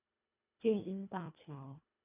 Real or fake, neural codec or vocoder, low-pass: fake; codec, 24 kHz, 1.5 kbps, HILCodec; 3.6 kHz